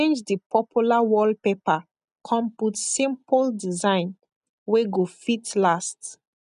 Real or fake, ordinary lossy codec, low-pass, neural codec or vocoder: real; none; 10.8 kHz; none